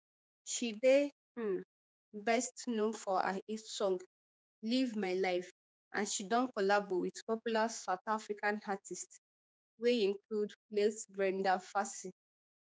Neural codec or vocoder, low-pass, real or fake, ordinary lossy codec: codec, 16 kHz, 4 kbps, X-Codec, HuBERT features, trained on general audio; none; fake; none